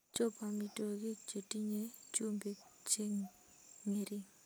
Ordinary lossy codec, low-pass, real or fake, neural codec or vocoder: none; none; real; none